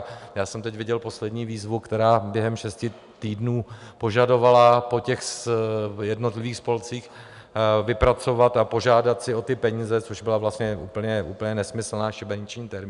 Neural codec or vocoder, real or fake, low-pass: none; real; 10.8 kHz